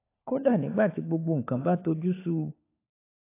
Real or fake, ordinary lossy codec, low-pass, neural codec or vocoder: fake; AAC, 24 kbps; 3.6 kHz; codec, 16 kHz, 4 kbps, FunCodec, trained on LibriTTS, 50 frames a second